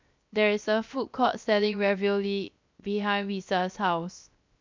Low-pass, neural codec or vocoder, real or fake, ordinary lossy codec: 7.2 kHz; codec, 16 kHz, 0.7 kbps, FocalCodec; fake; MP3, 64 kbps